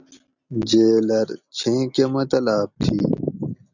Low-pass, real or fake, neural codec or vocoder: 7.2 kHz; real; none